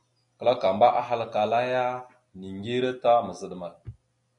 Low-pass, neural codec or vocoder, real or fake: 10.8 kHz; none; real